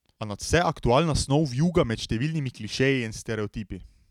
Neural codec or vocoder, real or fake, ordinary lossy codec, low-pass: none; real; none; 19.8 kHz